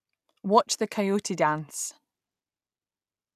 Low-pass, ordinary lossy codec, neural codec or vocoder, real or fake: 14.4 kHz; none; none; real